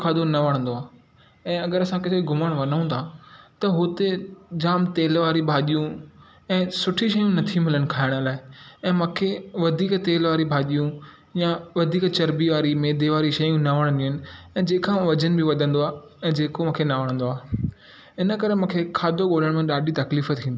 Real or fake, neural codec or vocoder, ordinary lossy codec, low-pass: real; none; none; none